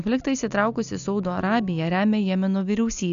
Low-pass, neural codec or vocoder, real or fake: 7.2 kHz; none; real